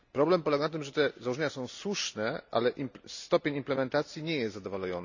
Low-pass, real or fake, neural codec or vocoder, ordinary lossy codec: 7.2 kHz; real; none; none